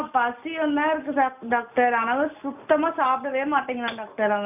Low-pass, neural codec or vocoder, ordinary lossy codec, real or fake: 3.6 kHz; none; none; real